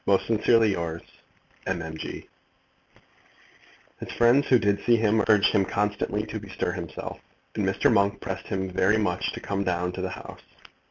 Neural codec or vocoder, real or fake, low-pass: none; real; 7.2 kHz